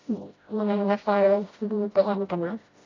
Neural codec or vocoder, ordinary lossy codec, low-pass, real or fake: codec, 16 kHz, 0.5 kbps, FreqCodec, smaller model; AAC, 32 kbps; 7.2 kHz; fake